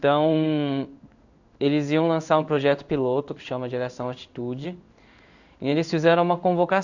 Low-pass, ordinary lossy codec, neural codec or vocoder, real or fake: 7.2 kHz; none; codec, 16 kHz in and 24 kHz out, 1 kbps, XY-Tokenizer; fake